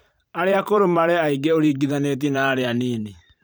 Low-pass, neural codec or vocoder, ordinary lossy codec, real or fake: none; vocoder, 44.1 kHz, 128 mel bands every 512 samples, BigVGAN v2; none; fake